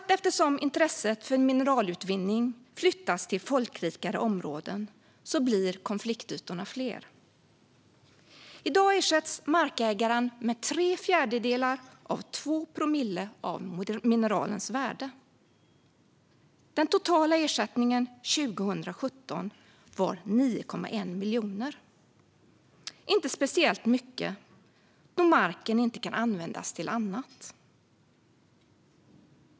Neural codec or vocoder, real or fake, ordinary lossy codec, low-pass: none; real; none; none